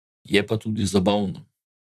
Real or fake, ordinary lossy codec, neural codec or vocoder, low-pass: fake; none; vocoder, 44.1 kHz, 128 mel bands, Pupu-Vocoder; 14.4 kHz